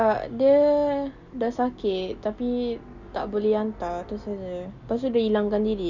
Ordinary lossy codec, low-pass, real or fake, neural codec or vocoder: none; 7.2 kHz; real; none